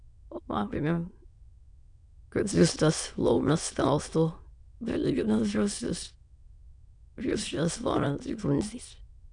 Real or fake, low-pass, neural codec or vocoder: fake; 9.9 kHz; autoencoder, 22.05 kHz, a latent of 192 numbers a frame, VITS, trained on many speakers